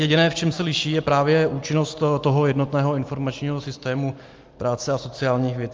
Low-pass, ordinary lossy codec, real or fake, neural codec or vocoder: 7.2 kHz; Opus, 32 kbps; real; none